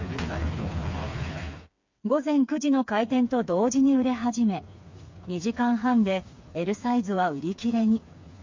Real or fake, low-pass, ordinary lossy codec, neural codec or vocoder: fake; 7.2 kHz; MP3, 48 kbps; codec, 16 kHz, 4 kbps, FreqCodec, smaller model